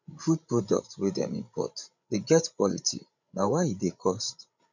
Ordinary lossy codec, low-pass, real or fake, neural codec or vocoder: none; 7.2 kHz; fake; codec, 16 kHz, 16 kbps, FreqCodec, larger model